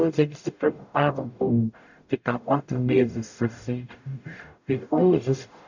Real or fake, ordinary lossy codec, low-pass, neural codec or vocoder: fake; none; 7.2 kHz; codec, 44.1 kHz, 0.9 kbps, DAC